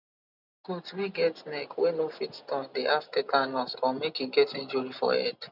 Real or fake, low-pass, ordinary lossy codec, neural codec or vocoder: real; 5.4 kHz; none; none